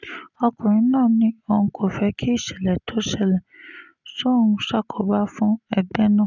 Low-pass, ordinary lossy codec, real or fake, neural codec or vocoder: 7.2 kHz; none; real; none